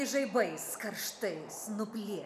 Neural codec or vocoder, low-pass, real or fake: none; 14.4 kHz; real